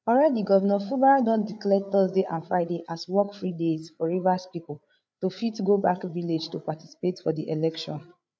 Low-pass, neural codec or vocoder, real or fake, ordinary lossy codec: none; codec, 16 kHz, 8 kbps, FreqCodec, larger model; fake; none